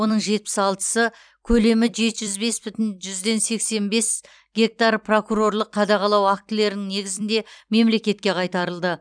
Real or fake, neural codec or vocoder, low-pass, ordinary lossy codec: fake; vocoder, 44.1 kHz, 128 mel bands every 256 samples, BigVGAN v2; 9.9 kHz; none